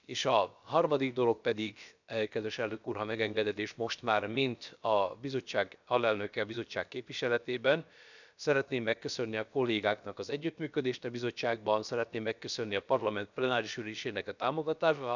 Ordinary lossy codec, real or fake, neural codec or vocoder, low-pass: none; fake; codec, 16 kHz, about 1 kbps, DyCAST, with the encoder's durations; 7.2 kHz